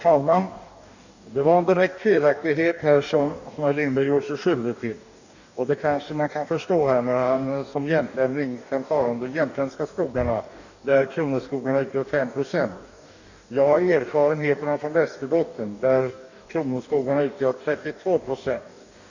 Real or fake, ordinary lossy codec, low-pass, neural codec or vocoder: fake; none; 7.2 kHz; codec, 44.1 kHz, 2.6 kbps, DAC